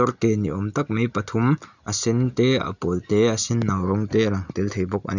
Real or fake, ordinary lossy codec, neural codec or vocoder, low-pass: real; none; none; 7.2 kHz